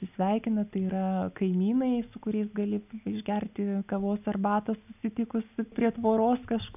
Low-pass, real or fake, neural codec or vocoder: 3.6 kHz; real; none